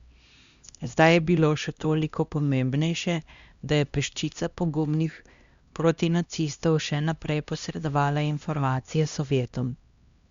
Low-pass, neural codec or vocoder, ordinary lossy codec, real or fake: 7.2 kHz; codec, 16 kHz, 1 kbps, X-Codec, HuBERT features, trained on LibriSpeech; Opus, 64 kbps; fake